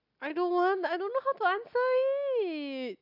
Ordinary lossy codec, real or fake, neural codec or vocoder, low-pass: none; real; none; 5.4 kHz